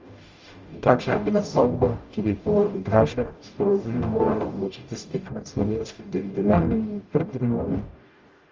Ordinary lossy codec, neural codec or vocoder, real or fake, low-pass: Opus, 32 kbps; codec, 44.1 kHz, 0.9 kbps, DAC; fake; 7.2 kHz